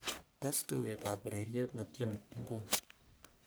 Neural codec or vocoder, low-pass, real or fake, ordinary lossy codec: codec, 44.1 kHz, 1.7 kbps, Pupu-Codec; none; fake; none